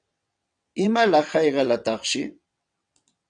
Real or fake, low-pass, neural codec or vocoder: fake; 9.9 kHz; vocoder, 22.05 kHz, 80 mel bands, WaveNeXt